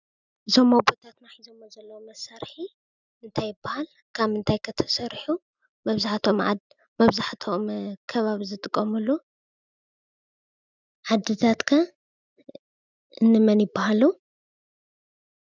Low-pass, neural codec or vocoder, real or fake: 7.2 kHz; none; real